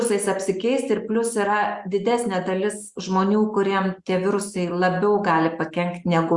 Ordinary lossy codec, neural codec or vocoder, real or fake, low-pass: Opus, 64 kbps; none; real; 10.8 kHz